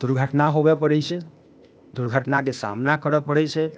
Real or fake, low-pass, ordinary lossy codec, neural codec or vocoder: fake; none; none; codec, 16 kHz, 0.8 kbps, ZipCodec